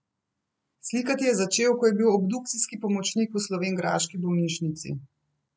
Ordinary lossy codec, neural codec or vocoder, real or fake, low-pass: none; none; real; none